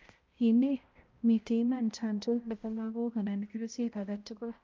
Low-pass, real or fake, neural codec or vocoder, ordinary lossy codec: 7.2 kHz; fake; codec, 16 kHz, 0.5 kbps, X-Codec, HuBERT features, trained on balanced general audio; Opus, 24 kbps